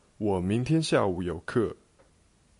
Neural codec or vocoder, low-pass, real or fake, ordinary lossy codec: none; 10.8 kHz; real; AAC, 64 kbps